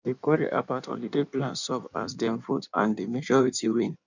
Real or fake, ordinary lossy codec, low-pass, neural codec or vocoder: fake; none; 7.2 kHz; codec, 16 kHz in and 24 kHz out, 1.1 kbps, FireRedTTS-2 codec